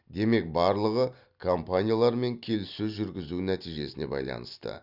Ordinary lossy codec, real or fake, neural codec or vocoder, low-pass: none; real; none; 5.4 kHz